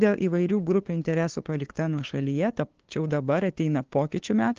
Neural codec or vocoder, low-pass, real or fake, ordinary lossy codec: codec, 16 kHz, 2 kbps, FunCodec, trained on LibriTTS, 25 frames a second; 7.2 kHz; fake; Opus, 16 kbps